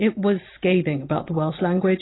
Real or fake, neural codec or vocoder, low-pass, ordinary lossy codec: real; none; 7.2 kHz; AAC, 16 kbps